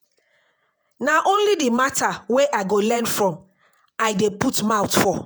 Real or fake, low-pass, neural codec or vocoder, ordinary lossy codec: fake; none; vocoder, 48 kHz, 128 mel bands, Vocos; none